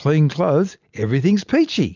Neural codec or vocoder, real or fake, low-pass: none; real; 7.2 kHz